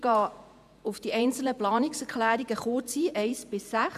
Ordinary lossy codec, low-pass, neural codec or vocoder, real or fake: MP3, 96 kbps; 14.4 kHz; vocoder, 48 kHz, 128 mel bands, Vocos; fake